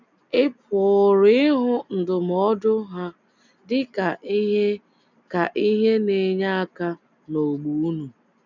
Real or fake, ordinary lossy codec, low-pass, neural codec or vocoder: real; none; 7.2 kHz; none